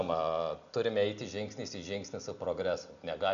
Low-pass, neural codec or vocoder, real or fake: 7.2 kHz; none; real